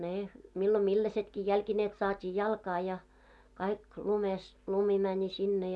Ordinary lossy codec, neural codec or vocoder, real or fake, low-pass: none; none; real; none